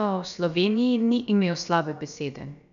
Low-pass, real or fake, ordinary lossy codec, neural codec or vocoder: 7.2 kHz; fake; none; codec, 16 kHz, about 1 kbps, DyCAST, with the encoder's durations